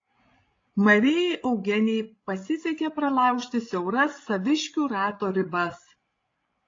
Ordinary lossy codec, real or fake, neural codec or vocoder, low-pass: AAC, 32 kbps; fake; codec, 16 kHz, 16 kbps, FreqCodec, larger model; 7.2 kHz